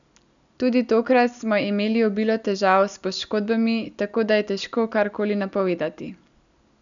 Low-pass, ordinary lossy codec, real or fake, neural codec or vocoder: 7.2 kHz; none; real; none